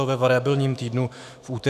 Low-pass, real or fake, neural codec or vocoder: 14.4 kHz; fake; autoencoder, 48 kHz, 128 numbers a frame, DAC-VAE, trained on Japanese speech